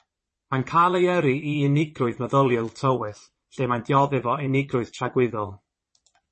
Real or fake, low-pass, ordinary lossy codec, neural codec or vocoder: fake; 9.9 kHz; MP3, 32 kbps; vocoder, 22.05 kHz, 80 mel bands, Vocos